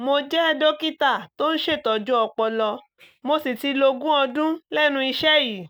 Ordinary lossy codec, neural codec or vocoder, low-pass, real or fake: none; none; none; real